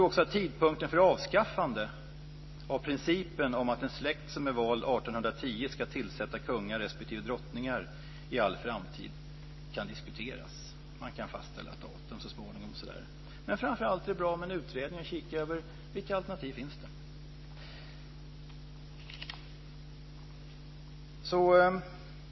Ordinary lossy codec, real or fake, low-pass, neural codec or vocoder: MP3, 24 kbps; real; 7.2 kHz; none